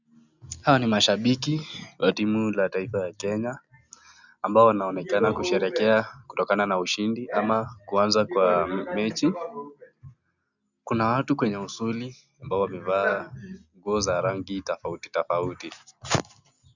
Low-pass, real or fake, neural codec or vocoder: 7.2 kHz; real; none